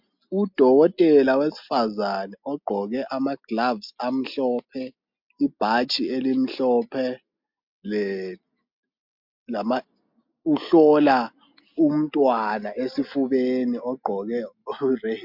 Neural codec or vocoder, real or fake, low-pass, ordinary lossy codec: none; real; 5.4 kHz; MP3, 48 kbps